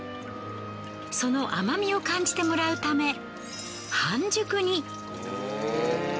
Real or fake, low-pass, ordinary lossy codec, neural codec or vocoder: real; none; none; none